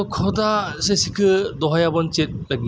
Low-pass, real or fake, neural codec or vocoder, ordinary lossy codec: none; real; none; none